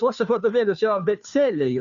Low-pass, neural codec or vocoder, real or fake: 7.2 kHz; codec, 16 kHz, 2 kbps, FunCodec, trained on Chinese and English, 25 frames a second; fake